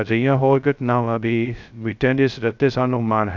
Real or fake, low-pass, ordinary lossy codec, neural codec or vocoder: fake; 7.2 kHz; none; codec, 16 kHz, 0.2 kbps, FocalCodec